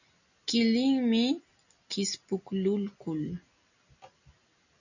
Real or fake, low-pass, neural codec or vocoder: real; 7.2 kHz; none